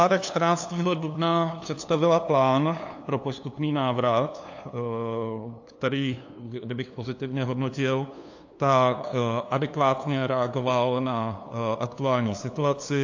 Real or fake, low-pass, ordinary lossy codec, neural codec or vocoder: fake; 7.2 kHz; AAC, 48 kbps; codec, 16 kHz, 2 kbps, FunCodec, trained on LibriTTS, 25 frames a second